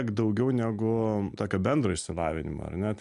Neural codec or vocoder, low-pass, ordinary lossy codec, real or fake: none; 10.8 kHz; AAC, 96 kbps; real